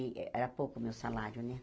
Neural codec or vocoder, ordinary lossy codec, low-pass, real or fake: none; none; none; real